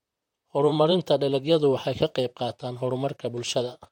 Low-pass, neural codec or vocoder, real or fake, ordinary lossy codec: 19.8 kHz; vocoder, 44.1 kHz, 128 mel bands, Pupu-Vocoder; fake; MP3, 64 kbps